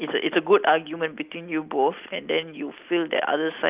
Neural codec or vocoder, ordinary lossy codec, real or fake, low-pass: none; Opus, 24 kbps; real; 3.6 kHz